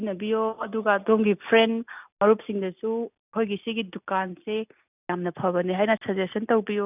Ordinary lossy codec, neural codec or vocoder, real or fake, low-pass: none; none; real; 3.6 kHz